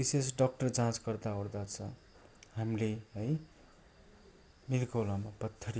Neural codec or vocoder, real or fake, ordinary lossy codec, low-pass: none; real; none; none